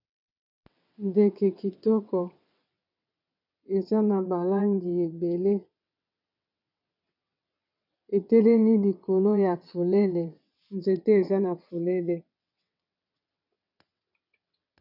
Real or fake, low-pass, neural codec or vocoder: fake; 5.4 kHz; vocoder, 22.05 kHz, 80 mel bands, WaveNeXt